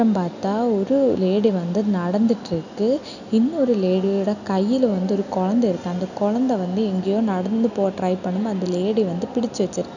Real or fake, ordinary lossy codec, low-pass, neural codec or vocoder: real; none; 7.2 kHz; none